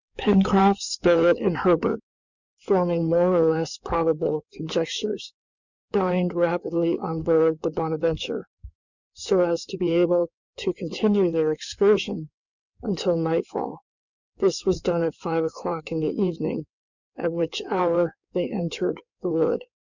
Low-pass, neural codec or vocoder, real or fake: 7.2 kHz; codec, 16 kHz in and 24 kHz out, 2.2 kbps, FireRedTTS-2 codec; fake